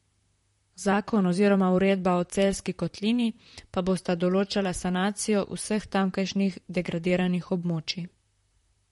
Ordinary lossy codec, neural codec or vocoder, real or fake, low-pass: MP3, 48 kbps; vocoder, 44.1 kHz, 128 mel bands, Pupu-Vocoder; fake; 19.8 kHz